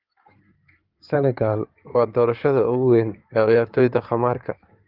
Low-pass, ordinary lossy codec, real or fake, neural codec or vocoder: 5.4 kHz; Opus, 32 kbps; fake; codec, 16 kHz in and 24 kHz out, 2.2 kbps, FireRedTTS-2 codec